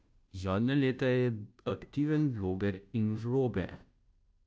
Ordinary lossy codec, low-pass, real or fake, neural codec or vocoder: none; none; fake; codec, 16 kHz, 0.5 kbps, FunCodec, trained on Chinese and English, 25 frames a second